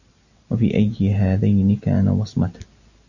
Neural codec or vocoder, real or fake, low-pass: none; real; 7.2 kHz